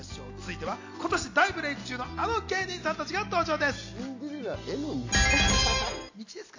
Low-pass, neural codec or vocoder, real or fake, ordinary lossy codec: 7.2 kHz; none; real; AAC, 48 kbps